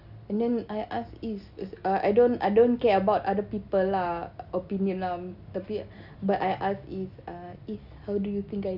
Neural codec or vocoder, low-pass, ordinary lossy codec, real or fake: none; 5.4 kHz; none; real